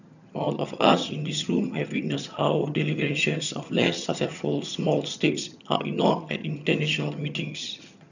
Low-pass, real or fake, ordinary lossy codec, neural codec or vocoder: 7.2 kHz; fake; none; vocoder, 22.05 kHz, 80 mel bands, HiFi-GAN